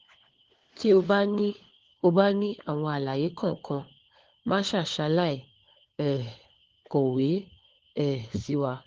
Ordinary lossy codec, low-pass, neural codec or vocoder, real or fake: Opus, 16 kbps; 7.2 kHz; codec, 16 kHz, 4 kbps, FunCodec, trained on LibriTTS, 50 frames a second; fake